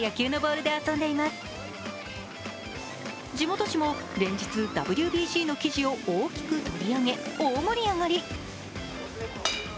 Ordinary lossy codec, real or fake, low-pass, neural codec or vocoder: none; real; none; none